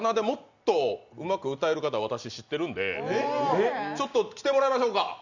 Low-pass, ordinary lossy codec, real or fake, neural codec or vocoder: 7.2 kHz; Opus, 64 kbps; real; none